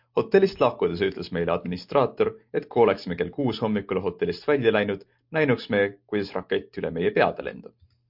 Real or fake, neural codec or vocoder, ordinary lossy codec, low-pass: real; none; MP3, 48 kbps; 5.4 kHz